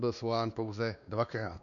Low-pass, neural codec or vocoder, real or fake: 7.2 kHz; codec, 16 kHz, 2 kbps, X-Codec, WavLM features, trained on Multilingual LibriSpeech; fake